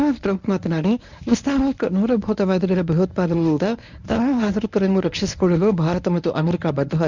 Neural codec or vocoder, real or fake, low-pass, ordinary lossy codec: codec, 24 kHz, 0.9 kbps, WavTokenizer, medium speech release version 1; fake; 7.2 kHz; none